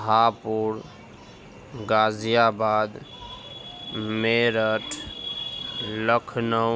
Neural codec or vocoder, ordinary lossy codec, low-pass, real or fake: none; none; none; real